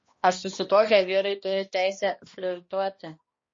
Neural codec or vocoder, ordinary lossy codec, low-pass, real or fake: codec, 16 kHz, 1 kbps, X-Codec, HuBERT features, trained on balanced general audio; MP3, 32 kbps; 7.2 kHz; fake